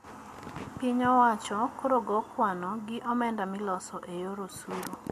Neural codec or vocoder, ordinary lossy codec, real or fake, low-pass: none; MP3, 64 kbps; real; 14.4 kHz